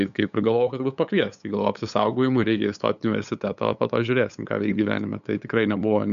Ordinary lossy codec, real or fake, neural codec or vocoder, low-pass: MP3, 96 kbps; fake; codec, 16 kHz, 8 kbps, FunCodec, trained on LibriTTS, 25 frames a second; 7.2 kHz